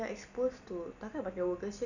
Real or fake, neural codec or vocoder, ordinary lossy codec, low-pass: real; none; none; 7.2 kHz